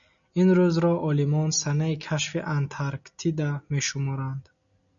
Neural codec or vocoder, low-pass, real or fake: none; 7.2 kHz; real